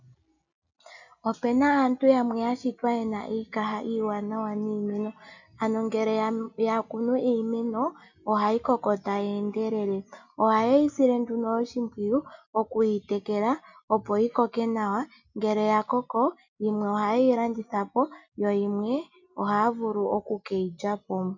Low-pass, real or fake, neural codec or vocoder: 7.2 kHz; real; none